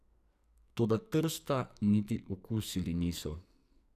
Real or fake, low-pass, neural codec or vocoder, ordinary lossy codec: fake; 14.4 kHz; codec, 44.1 kHz, 2.6 kbps, SNAC; none